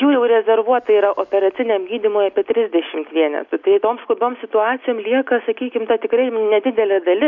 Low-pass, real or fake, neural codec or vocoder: 7.2 kHz; real; none